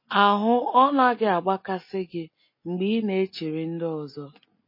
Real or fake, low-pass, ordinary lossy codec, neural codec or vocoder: real; 5.4 kHz; MP3, 24 kbps; none